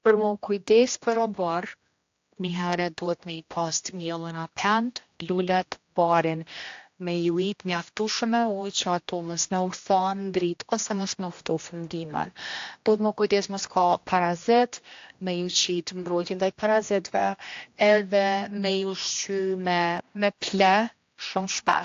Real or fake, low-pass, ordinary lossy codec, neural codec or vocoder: fake; 7.2 kHz; AAC, 48 kbps; codec, 16 kHz, 1 kbps, X-Codec, HuBERT features, trained on general audio